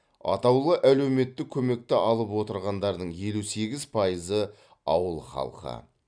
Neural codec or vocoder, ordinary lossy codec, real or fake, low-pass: none; none; real; 9.9 kHz